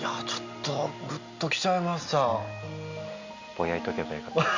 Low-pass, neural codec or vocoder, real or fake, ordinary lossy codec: 7.2 kHz; none; real; Opus, 64 kbps